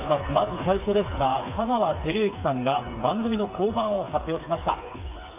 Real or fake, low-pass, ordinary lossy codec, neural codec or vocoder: fake; 3.6 kHz; AAC, 32 kbps; codec, 16 kHz, 4 kbps, FreqCodec, smaller model